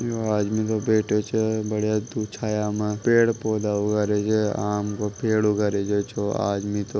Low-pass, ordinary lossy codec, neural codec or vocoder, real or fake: none; none; none; real